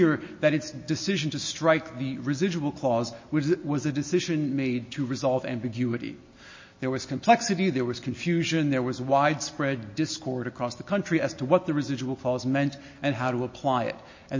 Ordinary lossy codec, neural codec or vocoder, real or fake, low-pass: MP3, 32 kbps; none; real; 7.2 kHz